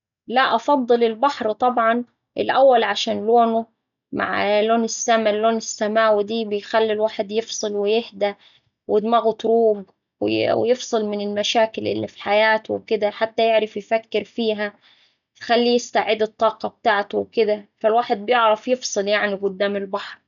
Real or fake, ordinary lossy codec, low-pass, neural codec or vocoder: real; none; 7.2 kHz; none